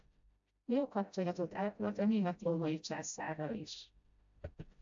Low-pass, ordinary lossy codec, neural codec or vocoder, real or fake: 7.2 kHz; AAC, 64 kbps; codec, 16 kHz, 0.5 kbps, FreqCodec, smaller model; fake